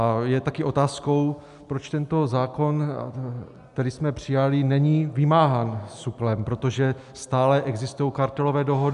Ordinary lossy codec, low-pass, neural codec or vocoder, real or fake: AAC, 96 kbps; 10.8 kHz; none; real